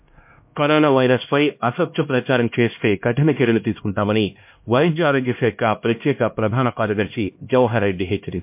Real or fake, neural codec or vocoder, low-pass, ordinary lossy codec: fake; codec, 16 kHz, 1 kbps, X-Codec, HuBERT features, trained on LibriSpeech; 3.6 kHz; MP3, 32 kbps